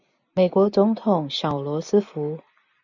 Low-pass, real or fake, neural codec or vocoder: 7.2 kHz; real; none